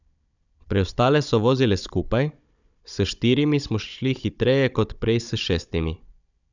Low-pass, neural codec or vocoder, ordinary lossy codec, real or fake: 7.2 kHz; codec, 16 kHz, 16 kbps, FunCodec, trained on Chinese and English, 50 frames a second; none; fake